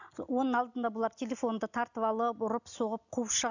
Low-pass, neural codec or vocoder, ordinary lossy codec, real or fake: 7.2 kHz; none; none; real